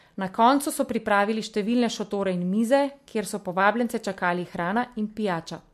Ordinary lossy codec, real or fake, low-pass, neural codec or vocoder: MP3, 64 kbps; real; 14.4 kHz; none